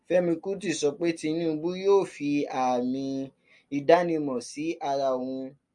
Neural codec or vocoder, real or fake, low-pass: none; real; 10.8 kHz